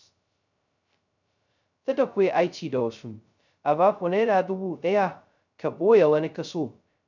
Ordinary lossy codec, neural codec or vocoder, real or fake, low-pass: none; codec, 16 kHz, 0.2 kbps, FocalCodec; fake; 7.2 kHz